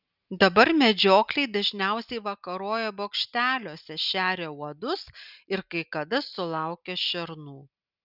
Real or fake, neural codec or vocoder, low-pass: real; none; 5.4 kHz